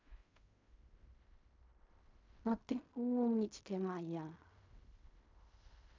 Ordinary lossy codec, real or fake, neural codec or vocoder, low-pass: MP3, 64 kbps; fake; codec, 16 kHz in and 24 kHz out, 0.4 kbps, LongCat-Audio-Codec, fine tuned four codebook decoder; 7.2 kHz